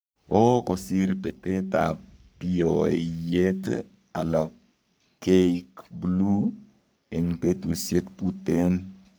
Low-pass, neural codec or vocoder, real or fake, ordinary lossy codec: none; codec, 44.1 kHz, 3.4 kbps, Pupu-Codec; fake; none